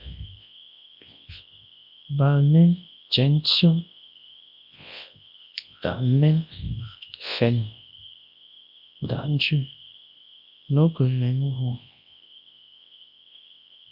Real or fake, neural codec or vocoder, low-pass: fake; codec, 24 kHz, 0.9 kbps, WavTokenizer, large speech release; 5.4 kHz